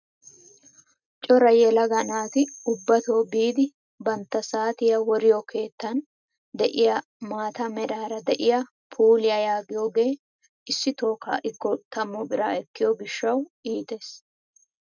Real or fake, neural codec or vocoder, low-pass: real; none; 7.2 kHz